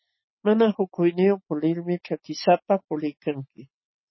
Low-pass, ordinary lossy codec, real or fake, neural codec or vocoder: 7.2 kHz; MP3, 24 kbps; real; none